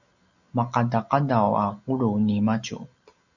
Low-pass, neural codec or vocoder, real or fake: 7.2 kHz; none; real